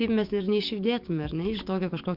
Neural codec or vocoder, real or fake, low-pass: codec, 44.1 kHz, 7.8 kbps, DAC; fake; 5.4 kHz